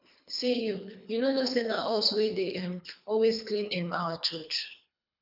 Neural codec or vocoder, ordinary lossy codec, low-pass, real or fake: codec, 24 kHz, 3 kbps, HILCodec; none; 5.4 kHz; fake